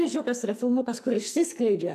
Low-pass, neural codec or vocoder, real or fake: 14.4 kHz; codec, 44.1 kHz, 2.6 kbps, SNAC; fake